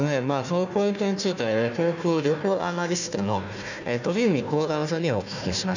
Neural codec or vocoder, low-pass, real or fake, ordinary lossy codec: codec, 16 kHz, 1 kbps, FunCodec, trained on Chinese and English, 50 frames a second; 7.2 kHz; fake; none